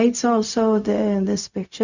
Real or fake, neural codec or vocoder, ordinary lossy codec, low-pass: fake; codec, 16 kHz, 0.4 kbps, LongCat-Audio-Codec; none; 7.2 kHz